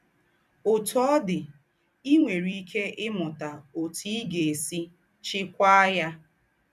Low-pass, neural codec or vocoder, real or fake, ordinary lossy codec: 14.4 kHz; vocoder, 44.1 kHz, 128 mel bands every 256 samples, BigVGAN v2; fake; none